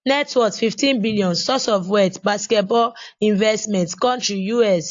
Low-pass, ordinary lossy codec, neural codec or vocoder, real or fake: 7.2 kHz; AAC, 48 kbps; none; real